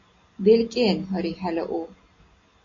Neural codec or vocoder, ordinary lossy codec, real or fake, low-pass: none; AAC, 32 kbps; real; 7.2 kHz